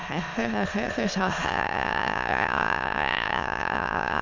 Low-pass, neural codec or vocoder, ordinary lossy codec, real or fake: 7.2 kHz; autoencoder, 22.05 kHz, a latent of 192 numbers a frame, VITS, trained on many speakers; MP3, 64 kbps; fake